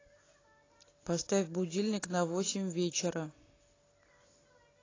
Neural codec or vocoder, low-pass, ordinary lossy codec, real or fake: none; 7.2 kHz; AAC, 32 kbps; real